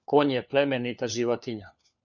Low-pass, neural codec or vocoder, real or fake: 7.2 kHz; codec, 16 kHz, 4 kbps, FunCodec, trained on LibriTTS, 50 frames a second; fake